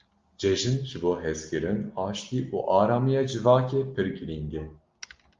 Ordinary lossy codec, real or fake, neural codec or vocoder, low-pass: Opus, 32 kbps; real; none; 7.2 kHz